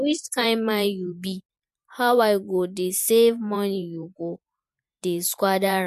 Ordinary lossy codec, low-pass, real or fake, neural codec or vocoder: MP3, 64 kbps; 14.4 kHz; fake; vocoder, 44.1 kHz, 128 mel bands every 512 samples, BigVGAN v2